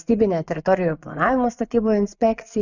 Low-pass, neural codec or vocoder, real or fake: 7.2 kHz; vocoder, 24 kHz, 100 mel bands, Vocos; fake